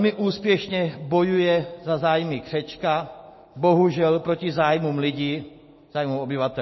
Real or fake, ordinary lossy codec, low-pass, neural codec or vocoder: real; MP3, 24 kbps; 7.2 kHz; none